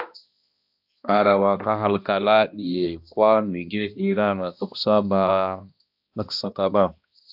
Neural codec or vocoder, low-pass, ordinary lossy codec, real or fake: codec, 16 kHz, 1 kbps, X-Codec, HuBERT features, trained on balanced general audio; 5.4 kHz; AAC, 48 kbps; fake